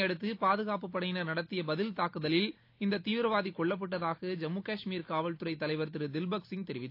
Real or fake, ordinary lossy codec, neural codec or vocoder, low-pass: real; AAC, 32 kbps; none; 5.4 kHz